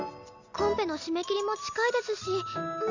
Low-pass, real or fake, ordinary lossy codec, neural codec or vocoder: 7.2 kHz; real; none; none